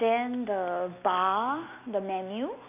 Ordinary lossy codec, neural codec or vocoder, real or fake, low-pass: none; none; real; 3.6 kHz